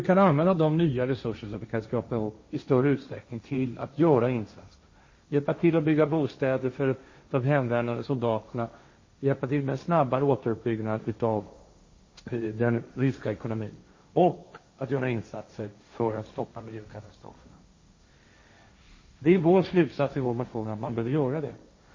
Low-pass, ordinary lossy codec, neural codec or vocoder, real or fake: 7.2 kHz; MP3, 32 kbps; codec, 16 kHz, 1.1 kbps, Voila-Tokenizer; fake